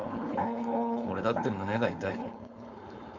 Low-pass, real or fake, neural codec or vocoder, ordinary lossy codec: 7.2 kHz; fake; codec, 16 kHz, 4.8 kbps, FACodec; MP3, 64 kbps